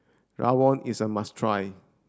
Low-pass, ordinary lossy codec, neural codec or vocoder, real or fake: none; none; none; real